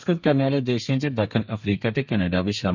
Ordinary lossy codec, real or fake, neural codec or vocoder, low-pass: none; fake; codec, 32 kHz, 1.9 kbps, SNAC; 7.2 kHz